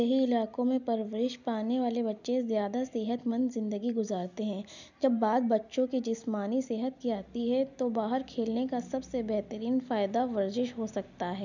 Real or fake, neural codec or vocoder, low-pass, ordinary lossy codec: real; none; 7.2 kHz; none